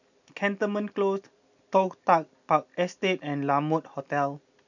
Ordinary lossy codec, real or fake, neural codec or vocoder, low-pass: none; real; none; 7.2 kHz